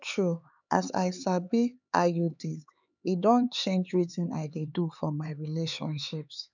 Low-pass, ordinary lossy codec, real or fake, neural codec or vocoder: 7.2 kHz; none; fake; codec, 16 kHz, 4 kbps, X-Codec, HuBERT features, trained on LibriSpeech